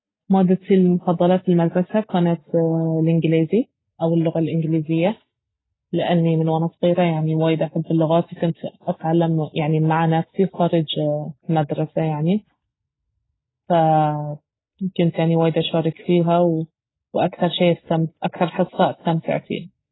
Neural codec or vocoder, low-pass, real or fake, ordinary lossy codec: none; 7.2 kHz; real; AAC, 16 kbps